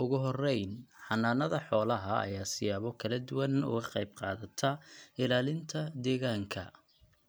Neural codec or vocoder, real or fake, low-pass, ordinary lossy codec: none; real; none; none